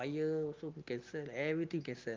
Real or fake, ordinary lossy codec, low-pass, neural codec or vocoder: real; Opus, 24 kbps; 7.2 kHz; none